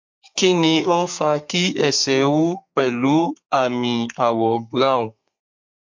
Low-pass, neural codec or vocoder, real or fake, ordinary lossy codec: 7.2 kHz; codec, 32 kHz, 1.9 kbps, SNAC; fake; MP3, 48 kbps